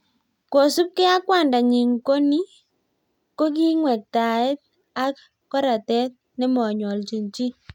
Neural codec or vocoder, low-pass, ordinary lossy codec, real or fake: none; 19.8 kHz; none; real